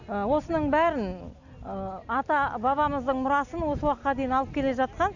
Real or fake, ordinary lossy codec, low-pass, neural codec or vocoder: real; none; 7.2 kHz; none